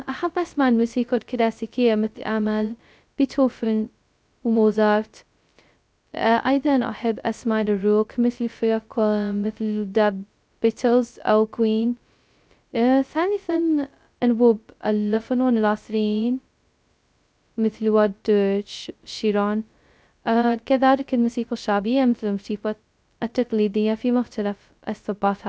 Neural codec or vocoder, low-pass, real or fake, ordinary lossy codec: codec, 16 kHz, 0.2 kbps, FocalCodec; none; fake; none